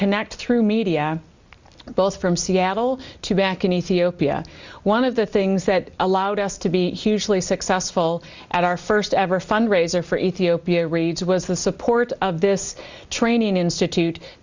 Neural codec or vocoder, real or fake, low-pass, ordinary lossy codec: none; real; 7.2 kHz; Opus, 64 kbps